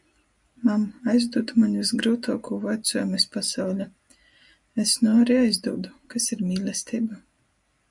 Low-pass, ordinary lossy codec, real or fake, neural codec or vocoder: 10.8 kHz; MP3, 96 kbps; real; none